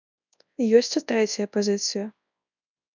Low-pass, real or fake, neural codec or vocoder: 7.2 kHz; fake; codec, 24 kHz, 0.9 kbps, WavTokenizer, large speech release